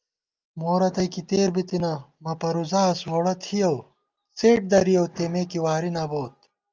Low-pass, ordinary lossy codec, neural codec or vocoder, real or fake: 7.2 kHz; Opus, 24 kbps; none; real